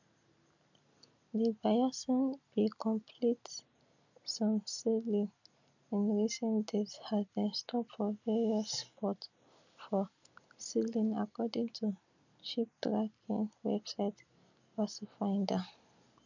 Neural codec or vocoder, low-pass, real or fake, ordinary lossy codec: none; 7.2 kHz; real; none